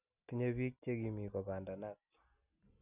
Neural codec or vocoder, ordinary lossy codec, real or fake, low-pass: none; none; real; 3.6 kHz